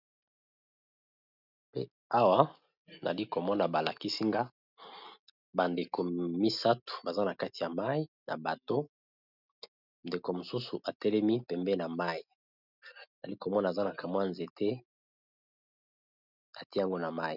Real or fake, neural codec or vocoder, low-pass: real; none; 5.4 kHz